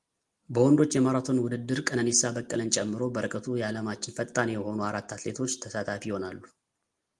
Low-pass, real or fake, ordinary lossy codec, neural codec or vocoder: 10.8 kHz; real; Opus, 24 kbps; none